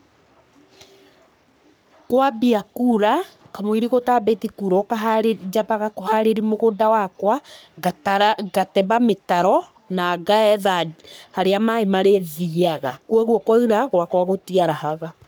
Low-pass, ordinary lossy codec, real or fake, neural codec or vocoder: none; none; fake; codec, 44.1 kHz, 3.4 kbps, Pupu-Codec